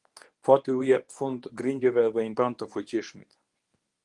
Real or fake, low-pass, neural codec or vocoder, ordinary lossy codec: fake; 10.8 kHz; codec, 24 kHz, 0.9 kbps, WavTokenizer, medium speech release version 1; Opus, 24 kbps